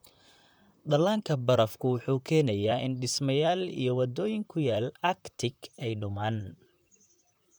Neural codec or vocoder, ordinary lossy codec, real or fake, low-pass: vocoder, 44.1 kHz, 128 mel bands, Pupu-Vocoder; none; fake; none